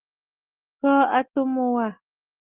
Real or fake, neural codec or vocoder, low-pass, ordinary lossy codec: real; none; 3.6 kHz; Opus, 16 kbps